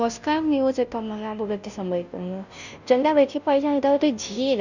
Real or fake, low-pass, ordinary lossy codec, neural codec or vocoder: fake; 7.2 kHz; none; codec, 16 kHz, 0.5 kbps, FunCodec, trained on Chinese and English, 25 frames a second